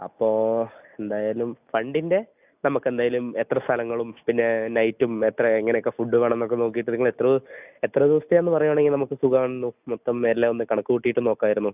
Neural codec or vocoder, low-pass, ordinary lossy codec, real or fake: none; 3.6 kHz; none; real